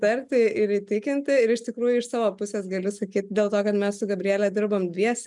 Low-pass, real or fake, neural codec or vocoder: 10.8 kHz; real; none